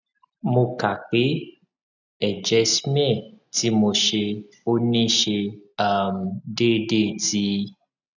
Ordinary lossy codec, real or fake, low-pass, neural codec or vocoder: none; real; 7.2 kHz; none